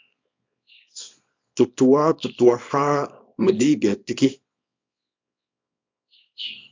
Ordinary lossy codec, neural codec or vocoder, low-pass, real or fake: MP3, 64 kbps; codec, 24 kHz, 0.9 kbps, WavTokenizer, small release; 7.2 kHz; fake